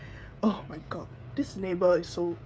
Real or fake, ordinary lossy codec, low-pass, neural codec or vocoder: fake; none; none; codec, 16 kHz, 16 kbps, FreqCodec, smaller model